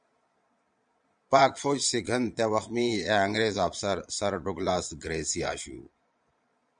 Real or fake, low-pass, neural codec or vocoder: fake; 9.9 kHz; vocoder, 22.05 kHz, 80 mel bands, Vocos